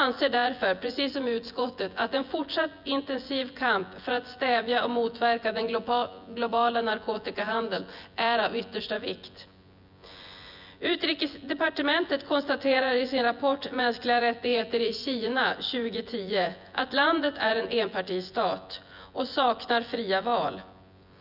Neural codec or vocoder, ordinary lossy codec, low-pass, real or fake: vocoder, 24 kHz, 100 mel bands, Vocos; none; 5.4 kHz; fake